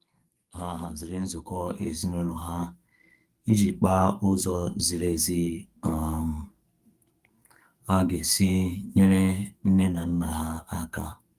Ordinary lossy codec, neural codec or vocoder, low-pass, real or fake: Opus, 32 kbps; codec, 44.1 kHz, 2.6 kbps, SNAC; 14.4 kHz; fake